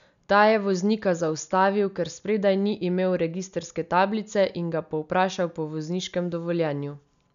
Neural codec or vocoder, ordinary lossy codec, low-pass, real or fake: none; none; 7.2 kHz; real